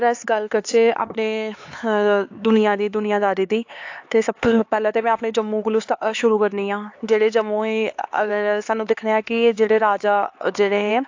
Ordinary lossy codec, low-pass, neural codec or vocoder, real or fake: AAC, 48 kbps; 7.2 kHz; codec, 16 kHz, 4 kbps, X-Codec, HuBERT features, trained on LibriSpeech; fake